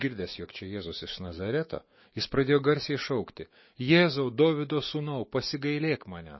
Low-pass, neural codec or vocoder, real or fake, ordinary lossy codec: 7.2 kHz; autoencoder, 48 kHz, 128 numbers a frame, DAC-VAE, trained on Japanese speech; fake; MP3, 24 kbps